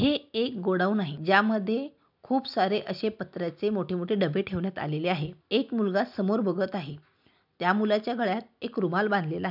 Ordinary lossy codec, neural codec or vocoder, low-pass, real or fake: none; none; 5.4 kHz; real